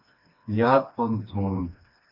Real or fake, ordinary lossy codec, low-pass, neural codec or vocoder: fake; MP3, 48 kbps; 5.4 kHz; codec, 16 kHz, 2 kbps, FreqCodec, smaller model